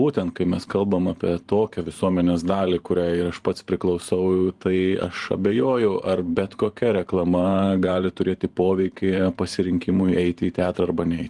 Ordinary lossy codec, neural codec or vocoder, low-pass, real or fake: Opus, 24 kbps; vocoder, 44.1 kHz, 128 mel bands every 512 samples, BigVGAN v2; 10.8 kHz; fake